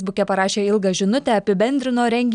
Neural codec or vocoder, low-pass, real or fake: none; 9.9 kHz; real